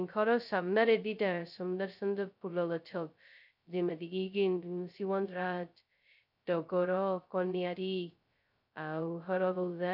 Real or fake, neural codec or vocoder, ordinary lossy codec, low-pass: fake; codec, 16 kHz, 0.2 kbps, FocalCodec; none; 5.4 kHz